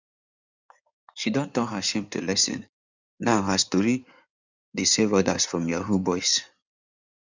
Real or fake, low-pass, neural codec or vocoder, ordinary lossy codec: fake; 7.2 kHz; codec, 16 kHz in and 24 kHz out, 2.2 kbps, FireRedTTS-2 codec; none